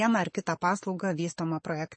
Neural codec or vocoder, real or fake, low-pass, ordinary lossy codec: none; real; 9.9 kHz; MP3, 32 kbps